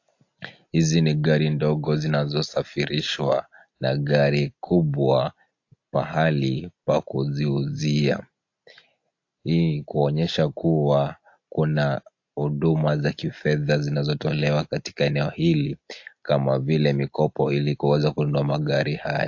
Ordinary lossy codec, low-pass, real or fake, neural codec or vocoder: AAC, 48 kbps; 7.2 kHz; real; none